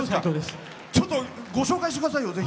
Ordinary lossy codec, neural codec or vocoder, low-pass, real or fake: none; none; none; real